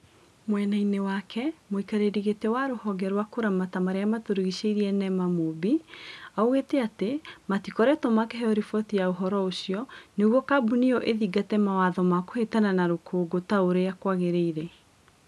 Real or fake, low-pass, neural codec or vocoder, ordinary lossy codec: real; none; none; none